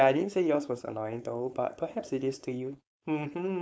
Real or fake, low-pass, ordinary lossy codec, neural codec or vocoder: fake; none; none; codec, 16 kHz, 4.8 kbps, FACodec